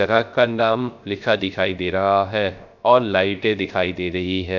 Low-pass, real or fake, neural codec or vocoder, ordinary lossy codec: 7.2 kHz; fake; codec, 16 kHz, 0.3 kbps, FocalCodec; none